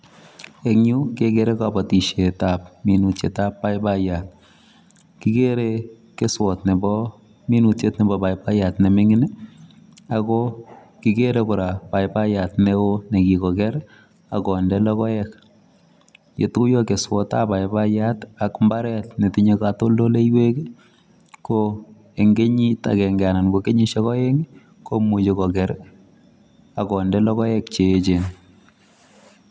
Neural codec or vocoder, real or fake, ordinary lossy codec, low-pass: none; real; none; none